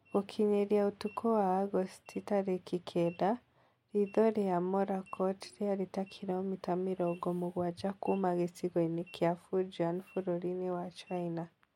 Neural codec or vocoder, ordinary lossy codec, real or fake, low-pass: none; MP3, 64 kbps; real; 19.8 kHz